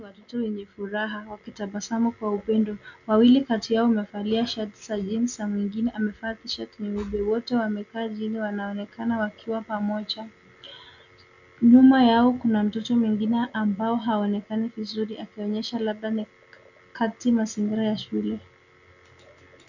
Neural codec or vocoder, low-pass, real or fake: none; 7.2 kHz; real